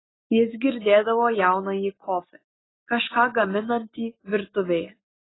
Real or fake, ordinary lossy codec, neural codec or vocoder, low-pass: real; AAC, 16 kbps; none; 7.2 kHz